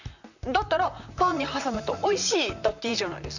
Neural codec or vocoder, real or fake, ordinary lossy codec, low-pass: vocoder, 44.1 kHz, 128 mel bands, Pupu-Vocoder; fake; none; 7.2 kHz